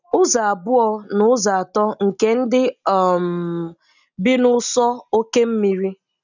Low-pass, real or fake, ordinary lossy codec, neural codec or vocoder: 7.2 kHz; real; none; none